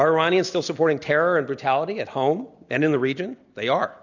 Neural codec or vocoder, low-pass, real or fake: none; 7.2 kHz; real